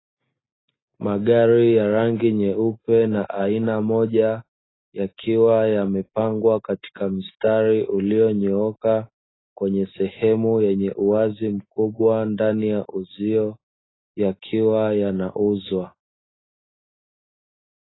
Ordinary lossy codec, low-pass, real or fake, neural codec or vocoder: AAC, 16 kbps; 7.2 kHz; real; none